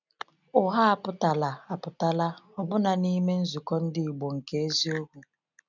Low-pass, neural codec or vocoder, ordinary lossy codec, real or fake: 7.2 kHz; none; none; real